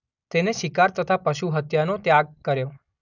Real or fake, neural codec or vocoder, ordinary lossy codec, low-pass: real; none; none; 7.2 kHz